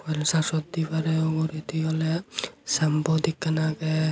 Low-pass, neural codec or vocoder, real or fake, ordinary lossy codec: none; none; real; none